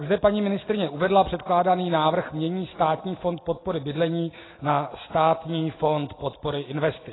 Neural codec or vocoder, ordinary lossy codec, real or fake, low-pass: none; AAC, 16 kbps; real; 7.2 kHz